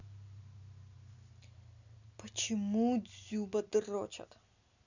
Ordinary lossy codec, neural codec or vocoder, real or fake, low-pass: none; none; real; 7.2 kHz